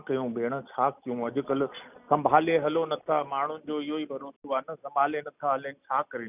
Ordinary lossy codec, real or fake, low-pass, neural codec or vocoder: none; real; 3.6 kHz; none